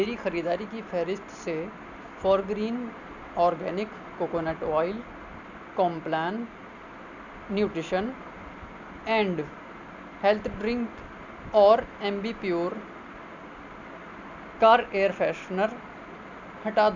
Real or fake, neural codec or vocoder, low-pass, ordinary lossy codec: real; none; 7.2 kHz; none